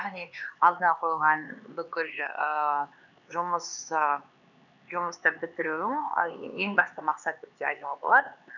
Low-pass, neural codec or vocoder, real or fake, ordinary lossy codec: 7.2 kHz; codec, 16 kHz, 4 kbps, X-Codec, HuBERT features, trained on LibriSpeech; fake; none